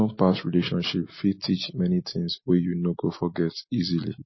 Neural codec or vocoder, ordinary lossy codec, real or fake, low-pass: none; MP3, 24 kbps; real; 7.2 kHz